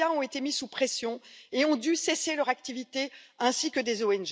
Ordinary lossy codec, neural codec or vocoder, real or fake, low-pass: none; none; real; none